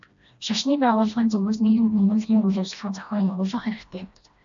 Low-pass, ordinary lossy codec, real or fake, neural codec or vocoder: 7.2 kHz; AAC, 64 kbps; fake; codec, 16 kHz, 1 kbps, FreqCodec, smaller model